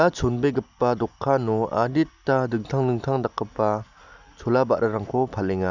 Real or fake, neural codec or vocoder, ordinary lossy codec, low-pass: real; none; none; 7.2 kHz